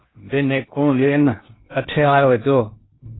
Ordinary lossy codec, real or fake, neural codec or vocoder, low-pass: AAC, 16 kbps; fake; codec, 16 kHz in and 24 kHz out, 0.6 kbps, FocalCodec, streaming, 2048 codes; 7.2 kHz